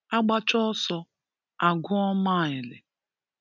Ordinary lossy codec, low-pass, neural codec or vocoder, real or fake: none; 7.2 kHz; none; real